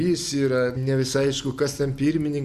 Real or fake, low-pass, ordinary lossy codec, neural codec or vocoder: real; 14.4 kHz; Opus, 64 kbps; none